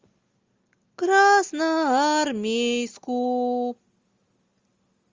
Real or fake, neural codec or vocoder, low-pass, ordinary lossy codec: real; none; 7.2 kHz; Opus, 32 kbps